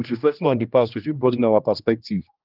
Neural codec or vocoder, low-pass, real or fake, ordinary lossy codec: codec, 16 kHz, 1 kbps, X-Codec, HuBERT features, trained on general audio; 5.4 kHz; fake; Opus, 32 kbps